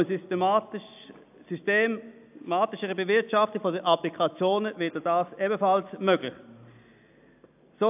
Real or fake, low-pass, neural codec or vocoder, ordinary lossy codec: real; 3.6 kHz; none; AAC, 32 kbps